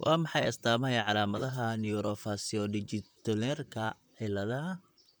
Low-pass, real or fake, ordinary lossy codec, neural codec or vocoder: none; fake; none; vocoder, 44.1 kHz, 128 mel bands, Pupu-Vocoder